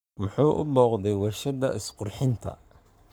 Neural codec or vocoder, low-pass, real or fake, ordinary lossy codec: codec, 44.1 kHz, 7.8 kbps, Pupu-Codec; none; fake; none